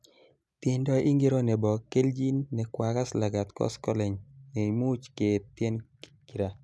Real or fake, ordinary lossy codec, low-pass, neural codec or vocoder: real; none; none; none